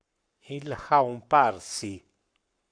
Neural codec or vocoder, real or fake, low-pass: codec, 44.1 kHz, 7.8 kbps, Pupu-Codec; fake; 9.9 kHz